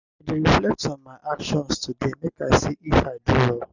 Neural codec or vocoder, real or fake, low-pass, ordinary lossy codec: none; real; 7.2 kHz; none